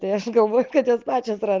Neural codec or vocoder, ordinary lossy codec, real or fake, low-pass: none; Opus, 24 kbps; real; 7.2 kHz